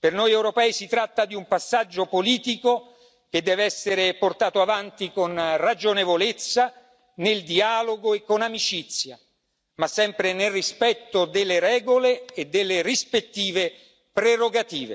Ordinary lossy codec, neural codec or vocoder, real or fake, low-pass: none; none; real; none